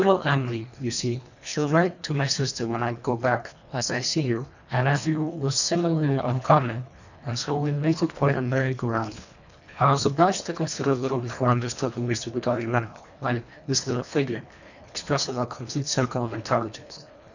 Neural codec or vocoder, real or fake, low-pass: codec, 24 kHz, 1.5 kbps, HILCodec; fake; 7.2 kHz